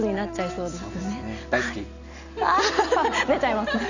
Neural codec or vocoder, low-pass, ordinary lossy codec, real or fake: none; 7.2 kHz; none; real